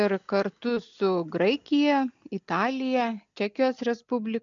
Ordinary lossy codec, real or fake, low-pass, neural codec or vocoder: MP3, 64 kbps; real; 7.2 kHz; none